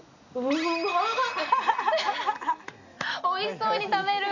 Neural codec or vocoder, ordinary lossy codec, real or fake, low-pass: none; none; real; 7.2 kHz